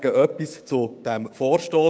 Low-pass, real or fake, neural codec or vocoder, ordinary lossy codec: none; fake; codec, 16 kHz, 6 kbps, DAC; none